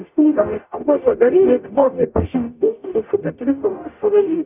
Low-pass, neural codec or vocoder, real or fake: 3.6 kHz; codec, 44.1 kHz, 0.9 kbps, DAC; fake